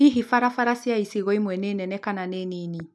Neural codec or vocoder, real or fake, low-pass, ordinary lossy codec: none; real; none; none